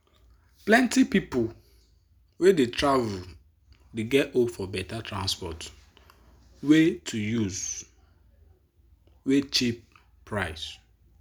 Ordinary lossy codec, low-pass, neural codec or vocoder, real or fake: none; none; none; real